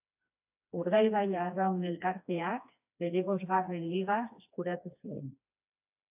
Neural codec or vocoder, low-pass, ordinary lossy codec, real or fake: codec, 16 kHz, 2 kbps, FreqCodec, smaller model; 3.6 kHz; AAC, 32 kbps; fake